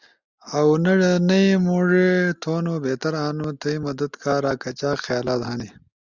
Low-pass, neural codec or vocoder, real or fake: 7.2 kHz; none; real